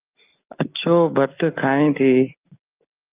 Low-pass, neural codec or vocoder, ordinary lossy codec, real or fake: 3.6 kHz; none; Opus, 24 kbps; real